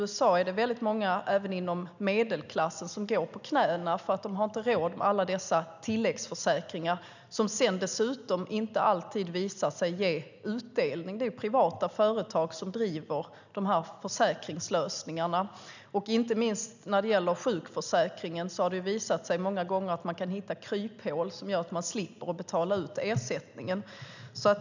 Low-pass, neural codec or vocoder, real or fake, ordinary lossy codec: 7.2 kHz; none; real; none